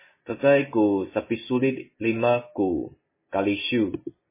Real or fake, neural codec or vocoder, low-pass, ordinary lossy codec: real; none; 3.6 kHz; MP3, 16 kbps